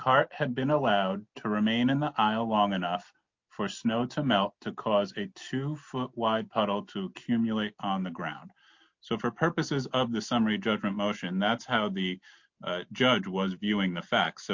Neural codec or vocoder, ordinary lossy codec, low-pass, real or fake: none; MP3, 48 kbps; 7.2 kHz; real